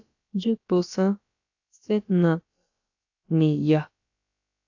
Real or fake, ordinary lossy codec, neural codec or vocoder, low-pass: fake; AAC, 48 kbps; codec, 16 kHz, about 1 kbps, DyCAST, with the encoder's durations; 7.2 kHz